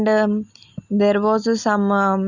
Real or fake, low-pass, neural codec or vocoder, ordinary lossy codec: real; 7.2 kHz; none; Opus, 64 kbps